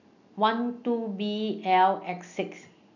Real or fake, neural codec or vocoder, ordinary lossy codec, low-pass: real; none; none; 7.2 kHz